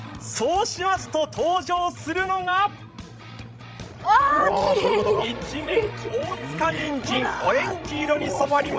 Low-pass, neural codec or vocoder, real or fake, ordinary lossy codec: none; codec, 16 kHz, 16 kbps, FreqCodec, larger model; fake; none